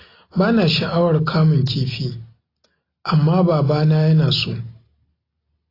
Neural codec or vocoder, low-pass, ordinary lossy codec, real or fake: none; 5.4 kHz; AAC, 24 kbps; real